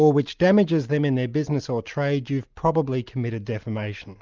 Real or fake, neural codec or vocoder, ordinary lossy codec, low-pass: real; none; Opus, 24 kbps; 7.2 kHz